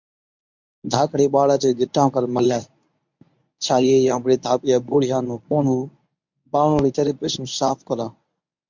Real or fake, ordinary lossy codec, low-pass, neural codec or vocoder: fake; MP3, 64 kbps; 7.2 kHz; codec, 24 kHz, 0.9 kbps, WavTokenizer, medium speech release version 1